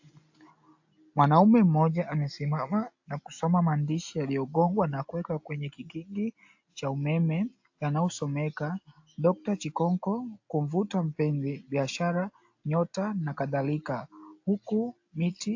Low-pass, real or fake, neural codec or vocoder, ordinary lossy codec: 7.2 kHz; real; none; AAC, 48 kbps